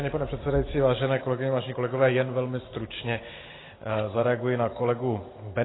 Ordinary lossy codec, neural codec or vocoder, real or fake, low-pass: AAC, 16 kbps; none; real; 7.2 kHz